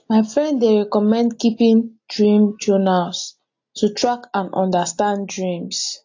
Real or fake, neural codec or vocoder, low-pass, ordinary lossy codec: real; none; 7.2 kHz; AAC, 48 kbps